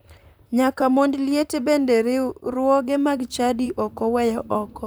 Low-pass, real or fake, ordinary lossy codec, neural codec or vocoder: none; fake; none; vocoder, 44.1 kHz, 128 mel bands, Pupu-Vocoder